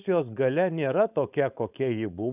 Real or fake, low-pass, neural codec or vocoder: fake; 3.6 kHz; codec, 16 kHz, 4.8 kbps, FACodec